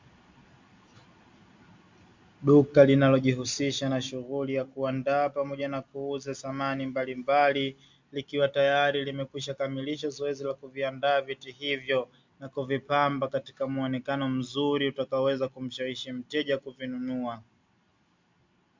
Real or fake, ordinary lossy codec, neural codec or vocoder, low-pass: real; MP3, 64 kbps; none; 7.2 kHz